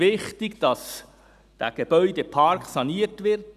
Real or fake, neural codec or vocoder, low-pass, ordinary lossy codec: real; none; 14.4 kHz; none